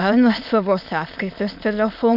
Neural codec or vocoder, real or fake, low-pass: autoencoder, 22.05 kHz, a latent of 192 numbers a frame, VITS, trained on many speakers; fake; 5.4 kHz